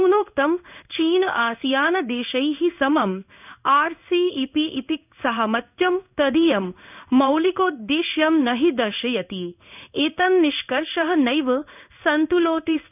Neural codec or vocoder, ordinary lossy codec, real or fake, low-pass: codec, 16 kHz in and 24 kHz out, 1 kbps, XY-Tokenizer; none; fake; 3.6 kHz